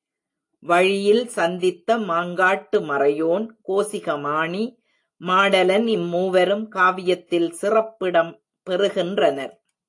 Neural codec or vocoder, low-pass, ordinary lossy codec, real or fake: none; 10.8 kHz; AAC, 48 kbps; real